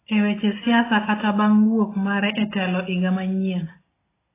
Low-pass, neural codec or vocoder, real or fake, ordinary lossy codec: 3.6 kHz; none; real; AAC, 16 kbps